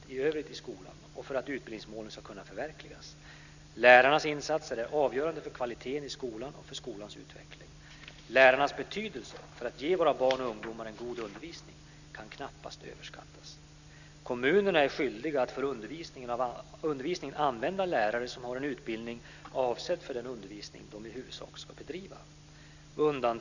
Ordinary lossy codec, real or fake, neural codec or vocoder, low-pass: none; real; none; 7.2 kHz